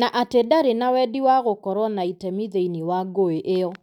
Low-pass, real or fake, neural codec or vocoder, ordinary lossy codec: 19.8 kHz; real; none; none